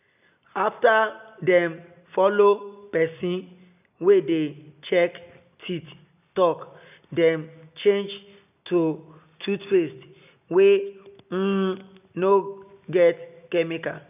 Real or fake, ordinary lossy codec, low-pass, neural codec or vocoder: real; none; 3.6 kHz; none